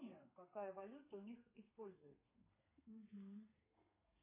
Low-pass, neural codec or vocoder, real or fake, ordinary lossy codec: 3.6 kHz; codec, 32 kHz, 1.9 kbps, SNAC; fake; MP3, 24 kbps